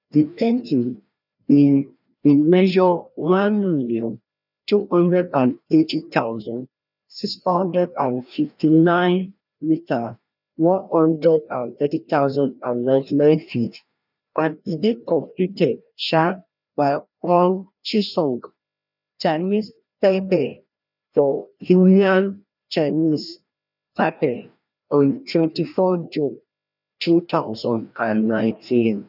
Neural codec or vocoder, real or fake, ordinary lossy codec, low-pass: codec, 16 kHz, 1 kbps, FreqCodec, larger model; fake; none; 5.4 kHz